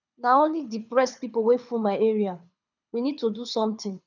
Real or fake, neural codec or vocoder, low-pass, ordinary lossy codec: fake; codec, 24 kHz, 6 kbps, HILCodec; 7.2 kHz; none